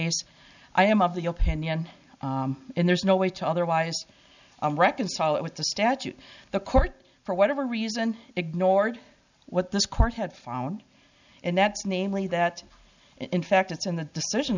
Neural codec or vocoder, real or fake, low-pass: none; real; 7.2 kHz